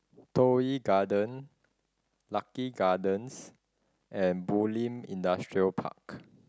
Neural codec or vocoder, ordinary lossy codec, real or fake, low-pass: none; none; real; none